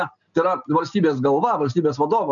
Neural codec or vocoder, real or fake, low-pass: none; real; 7.2 kHz